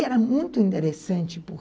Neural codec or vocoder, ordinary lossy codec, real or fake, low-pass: none; none; real; none